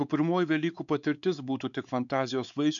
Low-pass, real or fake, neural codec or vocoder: 7.2 kHz; fake; codec, 16 kHz, 4 kbps, X-Codec, WavLM features, trained on Multilingual LibriSpeech